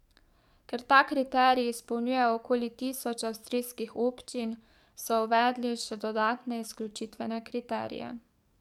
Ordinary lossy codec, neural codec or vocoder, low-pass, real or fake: MP3, 96 kbps; codec, 44.1 kHz, 7.8 kbps, DAC; 19.8 kHz; fake